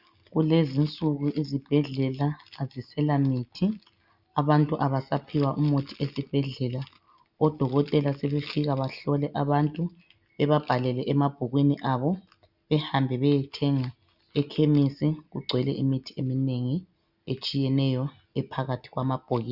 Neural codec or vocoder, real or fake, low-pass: none; real; 5.4 kHz